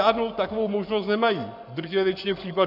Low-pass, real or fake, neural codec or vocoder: 5.4 kHz; fake; codec, 44.1 kHz, 7.8 kbps, Pupu-Codec